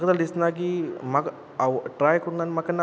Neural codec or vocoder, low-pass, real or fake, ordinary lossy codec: none; none; real; none